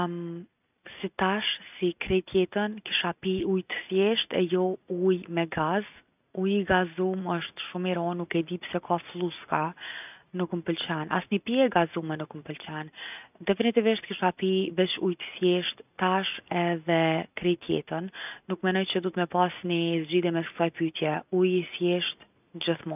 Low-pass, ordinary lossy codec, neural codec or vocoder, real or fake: 3.6 kHz; none; none; real